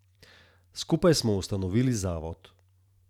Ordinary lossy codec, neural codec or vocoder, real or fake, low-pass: none; vocoder, 44.1 kHz, 128 mel bands every 512 samples, BigVGAN v2; fake; 19.8 kHz